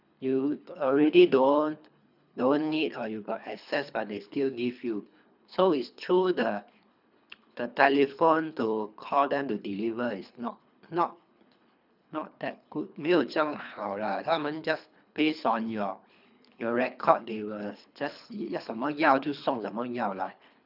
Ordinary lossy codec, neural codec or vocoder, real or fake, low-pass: none; codec, 24 kHz, 3 kbps, HILCodec; fake; 5.4 kHz